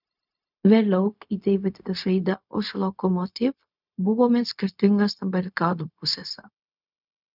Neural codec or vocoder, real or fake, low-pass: codec, 16 kHz, 0.4 kbps, LongCat-Audio-Codec; fake; 5.4 kHz